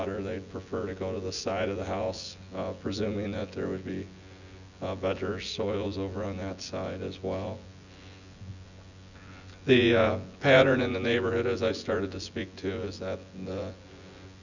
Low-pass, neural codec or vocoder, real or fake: 7.2 kHz; vocoder, 24 kHz, 100 mel bands, Vocos; fake